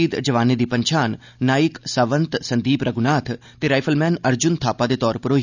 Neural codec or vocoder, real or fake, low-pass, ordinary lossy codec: none; real; 7.2 kHz; none